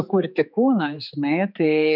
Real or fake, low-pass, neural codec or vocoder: fake; 5.4 kHz; codec, 16 kHz, 4 kbps, X-Codec, HuBERT features, trained on general audio